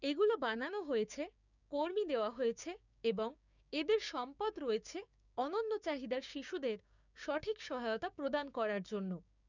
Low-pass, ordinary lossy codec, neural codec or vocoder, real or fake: 7.2 kHz; none; codec, 16 kHz, 6 kbps, DAC; fake